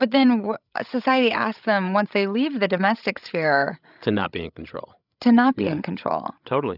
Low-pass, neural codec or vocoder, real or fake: 5.4 kHz; codec, 16 kHz, 16 kbps, FreqCodec, larger model; fake